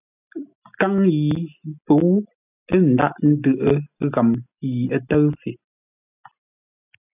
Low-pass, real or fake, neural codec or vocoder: 3.6 kHz; real; none